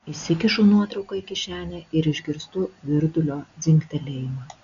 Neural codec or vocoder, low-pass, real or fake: none; 7.2 kHz; real